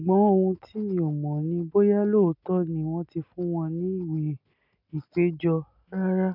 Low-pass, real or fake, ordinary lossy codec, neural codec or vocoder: 5.4 kHz; real; none; none